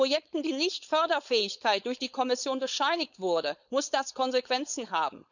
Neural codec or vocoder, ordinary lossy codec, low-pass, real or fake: codec, 16 kHz, 4.8 kbps, FACodec; none; 7.2 kHz; fake